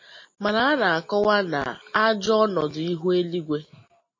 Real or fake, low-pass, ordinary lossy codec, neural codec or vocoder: real; 7.2 kHz; MP3, 32 kbps; none